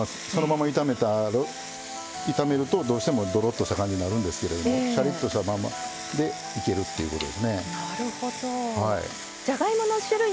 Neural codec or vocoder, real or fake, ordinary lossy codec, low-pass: none; real; none; none